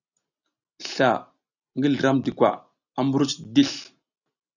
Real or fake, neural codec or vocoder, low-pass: real; none; 7.2 kHz